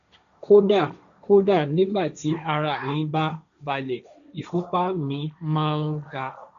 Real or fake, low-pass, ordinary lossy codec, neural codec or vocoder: fake; 7.2 kHz; none; codec, 16 kHz, 1.1 kbps, Voila-Tokenizer